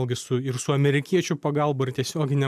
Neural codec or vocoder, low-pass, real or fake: none; 14.4 kHz; real